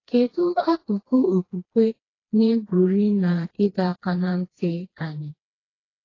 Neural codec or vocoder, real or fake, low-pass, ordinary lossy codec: codec, 16 kHz, 2 kbps, FreqCodec, smaller model; fake; 7.2 kHz; AAC, 32 kbps